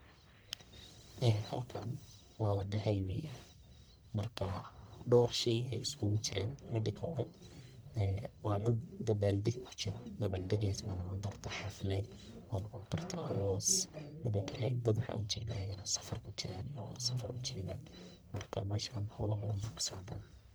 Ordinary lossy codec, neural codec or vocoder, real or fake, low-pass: none; codec, 44.1 kHz, 1.7 kbps, Pupu-Codec; fake; none